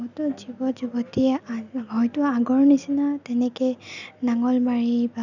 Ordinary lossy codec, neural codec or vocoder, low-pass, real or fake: none; none; 7.2 kHz; real